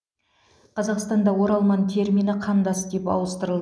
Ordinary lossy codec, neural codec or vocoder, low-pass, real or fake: none; none; none; real